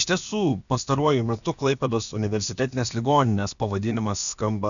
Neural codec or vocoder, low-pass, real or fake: codec, 16 kHz, about 1 kbps, DyCAST, with the encoder's durations; 7.2 kHz; fake